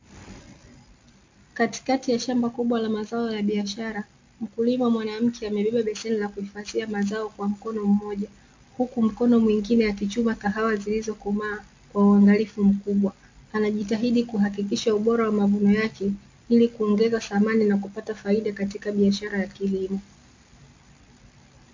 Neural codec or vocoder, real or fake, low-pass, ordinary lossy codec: none; real; 7.2 kHz; MP3, 48 kbps